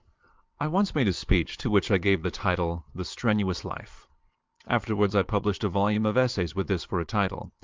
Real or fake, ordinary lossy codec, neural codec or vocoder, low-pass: fake; Opus, 24 kbps; vocoder, 22.05 kHz, 80 mel bands, Vocos; 7.2 kHz